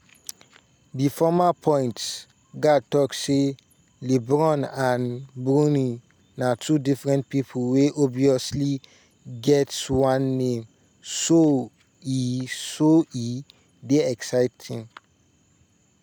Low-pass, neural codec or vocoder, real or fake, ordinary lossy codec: none; none; real; none